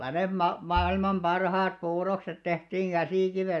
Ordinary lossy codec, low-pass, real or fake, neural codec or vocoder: none; none; real; none